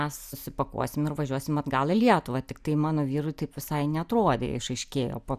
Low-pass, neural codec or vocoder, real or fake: 14.4 kHz; none; real